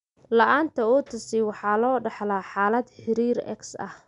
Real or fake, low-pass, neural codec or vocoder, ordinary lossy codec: real; 10.8 kHz; none; none